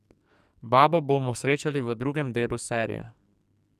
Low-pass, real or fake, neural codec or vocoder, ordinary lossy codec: 14.4 kHz; fake; codec, 44.1 kHz, 2.6 kbps, SNAC; none